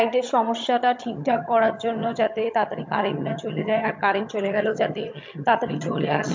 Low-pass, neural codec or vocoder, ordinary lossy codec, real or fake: 7.2 kHz; vocoder, 22.05 kHz, 80 mel bands, HiFi-GAN; MP3, 48 kbps; fake